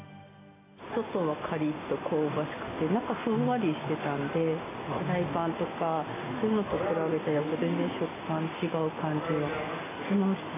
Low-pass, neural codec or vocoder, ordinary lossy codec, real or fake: 3.6 kHz; none; AAC, 16 kbps; real